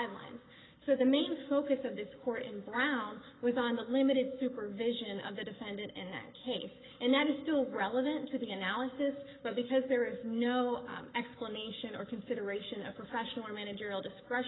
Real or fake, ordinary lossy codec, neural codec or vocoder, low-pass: fake; AAC, 16 kbps; codec, 44.1 kHz, 7.8 kbps, Pupu-Codec; 7.2 kHz